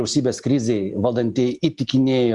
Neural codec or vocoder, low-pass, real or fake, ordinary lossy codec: none; 10.8 kHz; real; Opus, 64 kbps